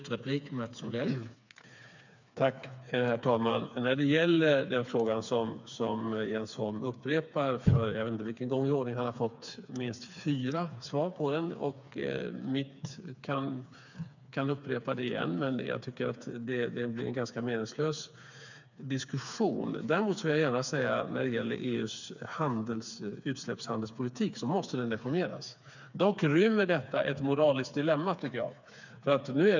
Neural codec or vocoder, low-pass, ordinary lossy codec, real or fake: codec, 16 kHz, 4 kbps, FreqCodec, smaller model; 7.2 kHz; none; fake